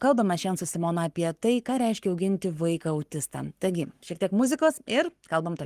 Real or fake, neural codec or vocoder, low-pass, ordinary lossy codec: fake; codec, 44.1 kHz, 7.8 kbps, Pupu-Codec; 14.4 kHz; Opus, 24 kbps